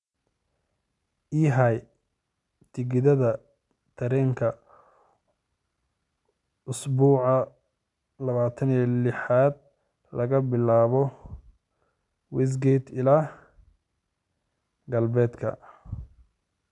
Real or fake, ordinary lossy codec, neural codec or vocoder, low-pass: real; none; none; 10.8 kHz